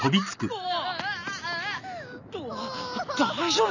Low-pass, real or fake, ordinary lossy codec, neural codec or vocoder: 7.2 kHz; real; none; none